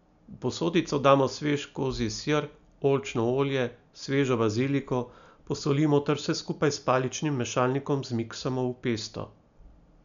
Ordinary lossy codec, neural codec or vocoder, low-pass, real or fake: none; none; 7.2 kHz; real